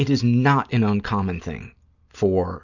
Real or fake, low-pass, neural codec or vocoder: real; 7.2 kHz; none